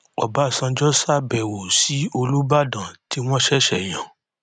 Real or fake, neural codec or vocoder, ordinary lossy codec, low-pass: real; none; none; 9.9 kHz